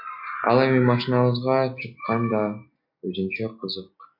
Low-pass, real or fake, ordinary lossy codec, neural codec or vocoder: 5.4 kHz; real; MP3, 48 kbps; none